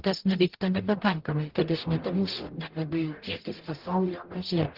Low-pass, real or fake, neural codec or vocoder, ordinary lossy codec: 5.4 kHz; fake; codec, 44.1 kHz, 0.9 kbps, DAC; Opus, 16 kbps